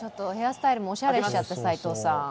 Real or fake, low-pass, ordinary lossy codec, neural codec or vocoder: real; none; none; none